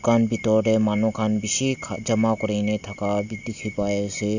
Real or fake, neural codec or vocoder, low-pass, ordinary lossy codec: real; none; 7.2 kHz; none